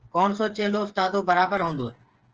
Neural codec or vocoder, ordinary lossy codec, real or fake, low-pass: codec, 16 kHz, 4 kbps, FreqCodec, smaller model; Opus, 16 kbps; fake; 7.2 kHz